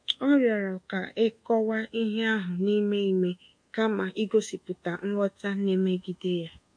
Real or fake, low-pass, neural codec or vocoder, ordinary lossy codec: fake; 9.9 kHz; codec, 24 kHz, 1.2 kbps, DualCodec; MP3, 48 kbps